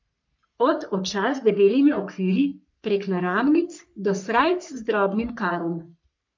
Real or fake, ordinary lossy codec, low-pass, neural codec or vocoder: fake; MP3, 64 kbps; 7.2 kHz; codec, 44.1 kHz, 3.4 kbps, Pupu-Codec